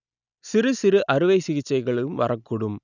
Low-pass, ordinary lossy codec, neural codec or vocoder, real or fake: 7.2 kHz; none; none; real